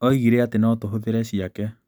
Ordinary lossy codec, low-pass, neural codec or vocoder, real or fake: none; none; none; real